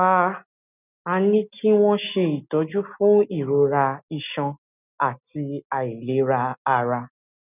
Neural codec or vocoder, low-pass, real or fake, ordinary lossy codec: none; 3.6 kHz; real; none